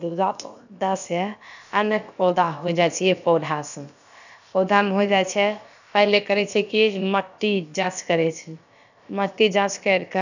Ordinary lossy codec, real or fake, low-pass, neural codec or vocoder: none; fake; 7.2 kHz; codec, 16 kHz, about 1 kbps, DyCAST, with the encoder's durations